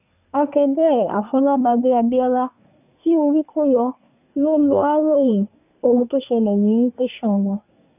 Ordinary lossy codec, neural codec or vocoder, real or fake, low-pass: none; codec, 24 kHz, 1 kbps, SNAC; fake; 3.6 kHz